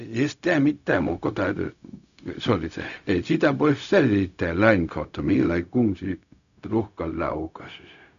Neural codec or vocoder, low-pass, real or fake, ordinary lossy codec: codec, 16 kHz, 0.4 kbps, LongCat-Audio-Codec; 7.2 kHz; fake; none